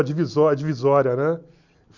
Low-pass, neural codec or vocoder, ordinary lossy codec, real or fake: 7.2 kHz; none; none; real